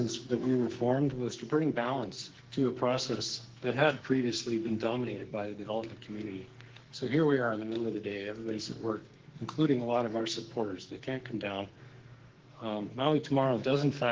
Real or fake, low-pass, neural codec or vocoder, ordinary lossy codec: fake; 7.2 kHz; codec, 44.1 kHz, 2.6 kbps, SNAC; Opus, 16 kbps